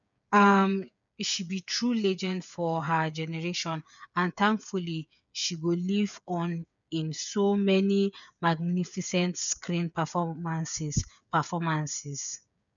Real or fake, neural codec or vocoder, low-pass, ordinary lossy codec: fake; codec, 16 kHz, 8 kbps, FreqCodec, smaller model; 7.2 kHz; none